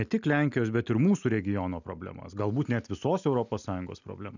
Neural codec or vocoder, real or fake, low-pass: none; real; 7.2 kHz